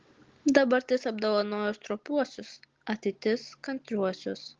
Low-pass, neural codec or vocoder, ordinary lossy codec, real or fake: 7.2 kHz; none; Opus, 16 kbps; real